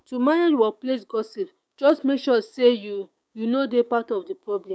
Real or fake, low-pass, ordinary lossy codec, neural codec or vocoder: fake; none; none; codec, 16 kHz, 6 kbps, DAC